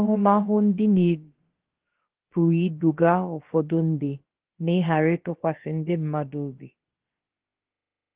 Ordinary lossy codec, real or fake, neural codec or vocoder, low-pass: Opus, 16 kbps; fake; codec, 16 kHz, about 1 kbps, DyCAST, with the encoder's durations; 3.6 kHz